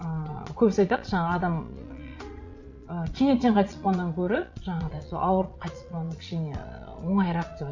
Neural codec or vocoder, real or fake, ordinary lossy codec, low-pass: vocoder, 22.05 kHz, 80 mel bands, Vocos; fake; none; 7.2 kHz